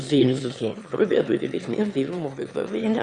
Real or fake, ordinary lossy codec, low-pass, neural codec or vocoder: fake; Opus, 64 kbps; 9.9 kHz; autoencoder, 22.05 kHz, a latent of 192 numbers a frame, VITS, trained on one speaker